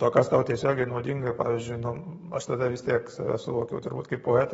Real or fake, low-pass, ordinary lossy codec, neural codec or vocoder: fake; 19.8 kHz; AAC, 24 kbps; codec, 44.1 kHz, 7.8 kbps, DAC